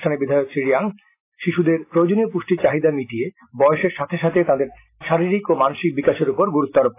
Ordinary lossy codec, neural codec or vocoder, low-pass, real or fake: AAC, 24 kbps; none; 3.6 kHz; real